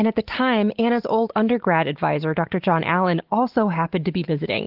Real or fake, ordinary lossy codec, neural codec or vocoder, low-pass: real; Opus, 16 kbps; none; 5.4 kHz